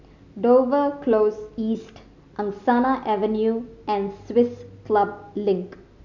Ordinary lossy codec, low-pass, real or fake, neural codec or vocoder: none; 7.2 kHz; real; none